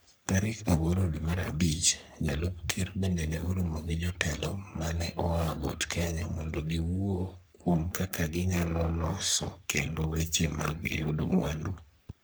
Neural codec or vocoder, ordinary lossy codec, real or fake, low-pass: codec, 44.1 kHz, 3.4 kbps, Pupu-Codec; none; fake; none